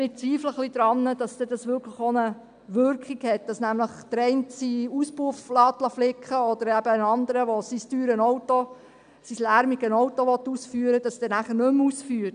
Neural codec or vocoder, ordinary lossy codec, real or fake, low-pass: none; none; real; 9.9 kHz